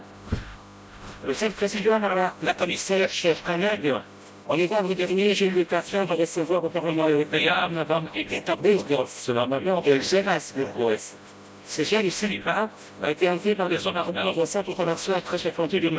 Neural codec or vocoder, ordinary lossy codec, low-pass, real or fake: codec, 16 kHz, 0.5 kbps, FreqCodec, smaller model; none; none; fake